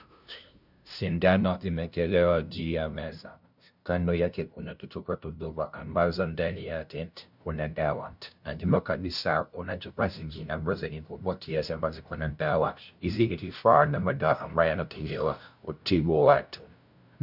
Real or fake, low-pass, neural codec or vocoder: fake; 5.4 kHz; codec, 16 kHz, 0.5 kbps, FunCodec, trained on LibriTTS, 25 frames a second